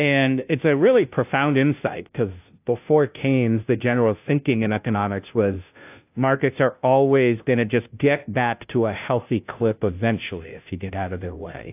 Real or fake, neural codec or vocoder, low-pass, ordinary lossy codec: fake; codec, 16 kHz, 0.5 kbps, FunCodec, trained on Chinese and English, 25 frames a second; 3.6 kHz; AAC, 32 kbps